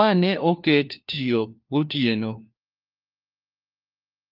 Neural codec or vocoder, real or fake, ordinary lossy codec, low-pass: codec, 16 kHz, 1 kbps, FunCodec, trained on LibriTTS, 50 frames a second; fake; Opus, 24 kbps; 5.4 kHz